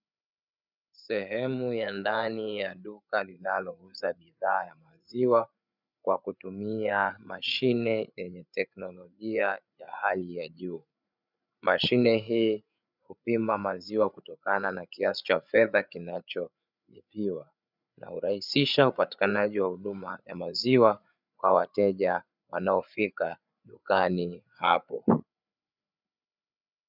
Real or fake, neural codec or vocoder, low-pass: fake; codec, 16 kHz, 8 kbps, FreqCodec, larger model; 5.4 kHz